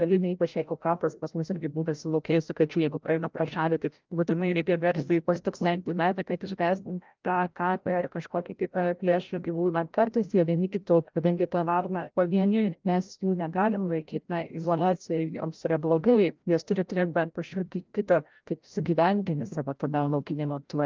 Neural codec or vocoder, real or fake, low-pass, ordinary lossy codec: codec, 16 kHz, 0.5 kbps, FreqCodec, larger model; fake; 7.2 kHz; Opus, 32 kbps